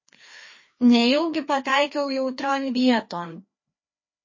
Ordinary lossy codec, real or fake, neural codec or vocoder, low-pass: MP3, 32 kbps; fake; codec, 16 kHz, 2 kbps, FreqCodec, larger model; 7.2 kHz